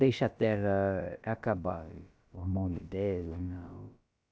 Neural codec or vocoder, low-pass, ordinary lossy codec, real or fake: codec, 16 kHz, about 1 kbps, DyCAST, with the encoder's durations; none; none; fake